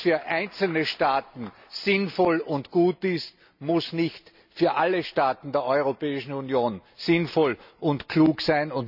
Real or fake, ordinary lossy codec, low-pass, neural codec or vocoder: real; none; 5.4 kHz; none